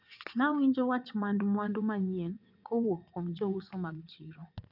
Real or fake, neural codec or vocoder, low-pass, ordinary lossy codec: fake; vocoder, 22.05 kHz, 80 mel bands, WaveNeXt; 5.4 kHz; none